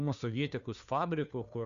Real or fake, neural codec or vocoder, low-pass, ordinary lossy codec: fake; codec, 16 kHz, 2 kbps, FunCodec, trained on Chinese and English, 25 frames a second; 7.2 kHz; MP3, 96 kbps